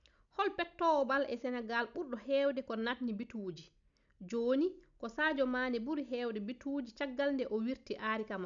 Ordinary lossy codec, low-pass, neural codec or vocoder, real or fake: none; 7.2 kHz; none; real